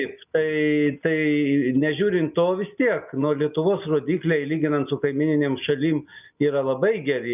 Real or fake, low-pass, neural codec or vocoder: real; 3.6 kHz; none